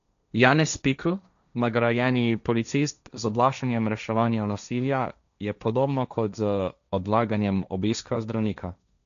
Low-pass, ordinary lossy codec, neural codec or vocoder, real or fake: 7.2 kHz; none; codec, 16 kHz, 1.1 kbps, Voila-Tokenizer; fake